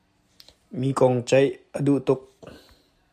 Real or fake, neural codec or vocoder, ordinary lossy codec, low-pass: real; none; MP3, 96 kbps; 14.4 kHz